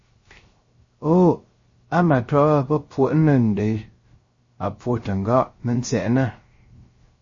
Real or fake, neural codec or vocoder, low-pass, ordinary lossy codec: fake; codec, 16 kHz, 0.3 kbps, FocalCodec; 7.2 kHz; MP3, 32 kbps